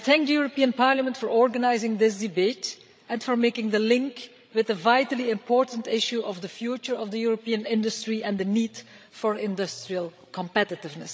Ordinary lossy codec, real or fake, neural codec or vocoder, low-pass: none; fake; codec, 16 kHz, 16 kbps, FreqCodec, larger model; none